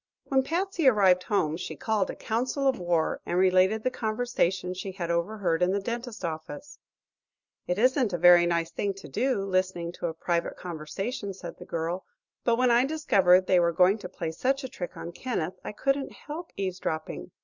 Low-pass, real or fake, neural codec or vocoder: 7.2 kHz; real; none